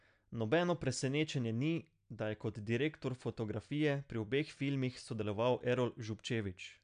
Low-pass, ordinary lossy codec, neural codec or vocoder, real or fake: 9.9 kHz; AAC, 96 kbps; none; real